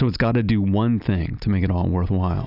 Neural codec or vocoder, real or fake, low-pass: none; real; 5.4 kHz